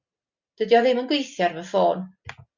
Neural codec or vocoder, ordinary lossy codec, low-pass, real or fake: none; Opus, 64 kbps; 7.2 kHz; real